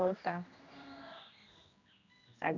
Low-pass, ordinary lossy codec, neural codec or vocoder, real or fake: 7.2 kHz; none; codec, 16 kHz, 1 kbps, X-Codec, HuBERT features, trained on general audio; fake